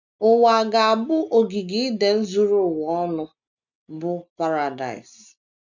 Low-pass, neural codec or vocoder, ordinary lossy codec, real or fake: 7.2 kHz; none; none; real